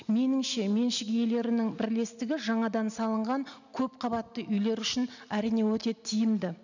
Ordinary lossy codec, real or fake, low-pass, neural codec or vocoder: none; real; 7.2 kHz; none